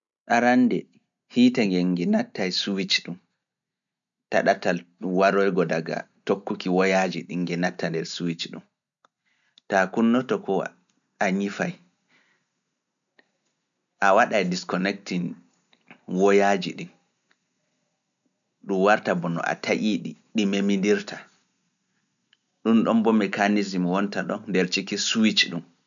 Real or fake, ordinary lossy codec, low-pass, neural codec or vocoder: real; none; 7.2 kHz; none